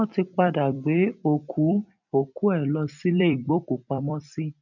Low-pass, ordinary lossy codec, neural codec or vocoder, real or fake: 7.2 kHz; none; vocoder, 44.1 kHz, 128 mel bands every 256 samples, BigVGAN v2; fake